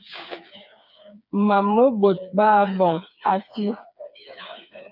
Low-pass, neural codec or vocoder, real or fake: 5.4 kHz; codec, 16 kHz, 4 kbps, FreqCodec, smaller model; fake